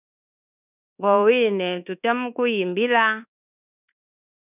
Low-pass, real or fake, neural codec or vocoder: 3.6 kHz; fake; codec, 24 kHz, 1.2 kbps, DualCodec